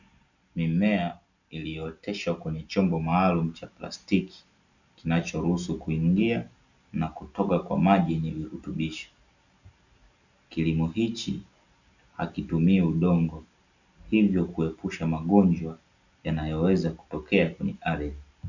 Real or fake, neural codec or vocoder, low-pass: real; none; 7.2 kHz